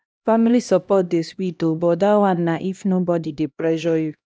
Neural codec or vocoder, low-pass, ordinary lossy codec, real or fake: codec, 16 kHz, 1 kbps, X-Codec, HuBERT features, trained on LibriSpeech; none; none; fake